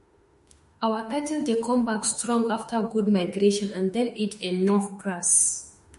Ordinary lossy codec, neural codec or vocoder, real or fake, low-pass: MP3, 48 kbps; autoencoder, 48 kHz, 32 numbers a frame, DAC-VAE, trained on Japanese speech; fake; 14.4 kHz